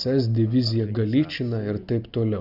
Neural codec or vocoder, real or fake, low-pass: none; real; 5.4 kHz